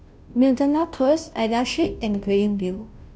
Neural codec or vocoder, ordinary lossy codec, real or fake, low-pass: codec, 16 kHz, 0.5 kbps, FunCodec, trained on Chinese and English, 25 frames a second; none; fake; none